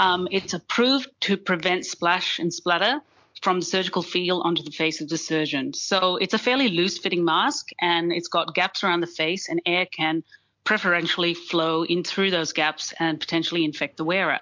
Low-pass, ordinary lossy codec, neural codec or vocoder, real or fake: 7.2 kHz; MP3, 64 kbps; none; real